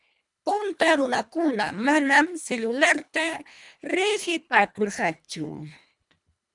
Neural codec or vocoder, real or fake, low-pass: codec, 24 kHz, 1.5 kbps, HILCodec; fake; 10.8 kHz